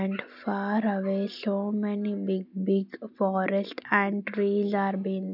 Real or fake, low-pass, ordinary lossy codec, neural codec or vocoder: real; 5.4 kHz; none; none